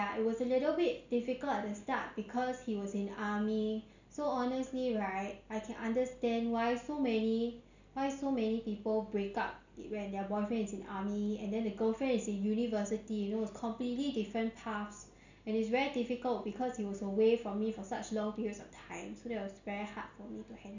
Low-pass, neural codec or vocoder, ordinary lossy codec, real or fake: 7.2 kHz; none; none; real